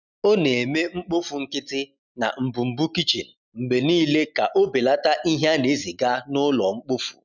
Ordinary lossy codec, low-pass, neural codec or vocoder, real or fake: none; 7.2 kHz; none; real